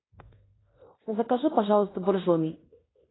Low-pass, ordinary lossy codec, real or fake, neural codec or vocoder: 7.2 kHz; AAC, 16 kbps; fake; codec, 16 kHz in and 24 kHz out, 0.9 kbps, LongCat-Audio-Codec, fine tuned four codebook decoder